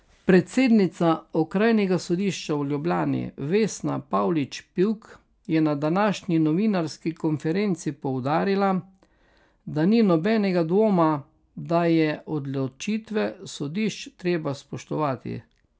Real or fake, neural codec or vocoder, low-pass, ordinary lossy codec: real; none; none; none